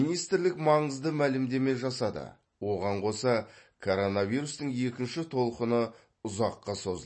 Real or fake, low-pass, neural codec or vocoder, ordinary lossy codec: real; 9.9 kHz; none; MP3, 32 kbps